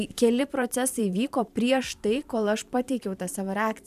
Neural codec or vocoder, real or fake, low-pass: vocoder, 44.1 kHz, 128 mel bands every 512 samples, BigVGAN v2; fake; 14.4 kHz